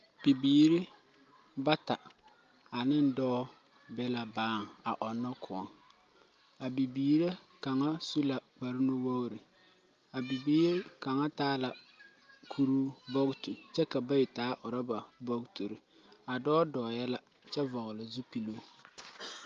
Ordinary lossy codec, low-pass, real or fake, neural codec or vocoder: Opus, 32 kbps; 7.2 kHz; real; none